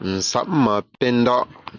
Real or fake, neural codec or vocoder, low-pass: real; none; 7.2 kHz